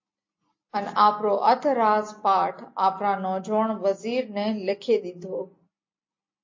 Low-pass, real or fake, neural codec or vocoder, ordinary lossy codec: 7.2 kHz; real; none; MP3, 32 kbps